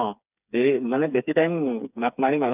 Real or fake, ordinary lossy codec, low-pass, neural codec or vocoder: fake; none; 3.6 kHz; codec, 16 kHz, 4 kbps, FreqCodec, smaller model